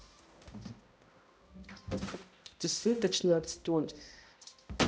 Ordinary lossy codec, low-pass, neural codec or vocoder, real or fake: none; none; codec, 16 kHz, 0.5 kbps, X-Codec, HuBERT features, trained on balanced general audio; fake